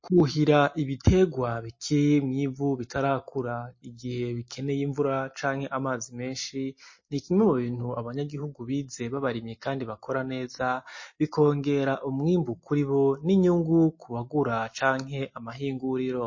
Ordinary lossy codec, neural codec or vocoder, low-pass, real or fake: MP3, 32 kbps; none; 7.2 kHz; real